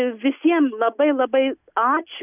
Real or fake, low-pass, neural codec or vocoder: real; 3.6 kHz; none